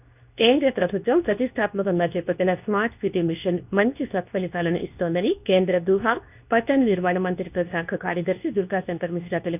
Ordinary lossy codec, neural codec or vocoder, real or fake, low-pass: none; codec, 24 kHz, 0.9 kbps, WavTokenizer, medium speech release version 2; fake; 3.6 kHz